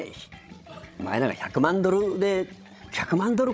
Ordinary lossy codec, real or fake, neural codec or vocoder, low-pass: none; fake; codec, 16 kHz, 16 kbps, FreqCodec, larger model; none